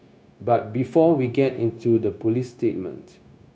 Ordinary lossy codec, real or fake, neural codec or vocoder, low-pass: none; fake; codec, 16 kHz, 0.9 kbps, LongCat-Audio-Codec; none